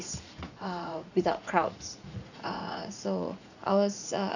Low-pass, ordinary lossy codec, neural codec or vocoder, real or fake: 7.2 kHz; none; vocoder, 44.1 kHz, 80 mel bands, Vocos; fake